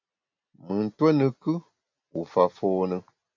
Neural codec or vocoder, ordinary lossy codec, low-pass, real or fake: none; MP3, 48 kbps; 7.2 kHz; real